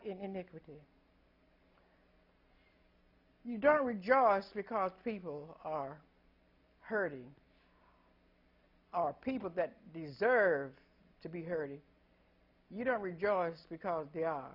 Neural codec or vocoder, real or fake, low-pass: none; real; 5.4 kHz